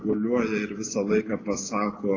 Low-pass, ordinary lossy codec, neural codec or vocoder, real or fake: 7.2 kHz; AAC, 32 kbps; none; real